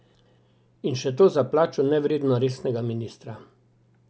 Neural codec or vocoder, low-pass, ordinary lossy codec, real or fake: none; none; none; real